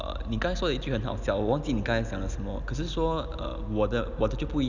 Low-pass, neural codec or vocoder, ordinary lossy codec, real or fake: 7.2 kHz; none; none; real